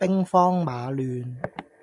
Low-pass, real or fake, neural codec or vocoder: 10.8 kHz; real; none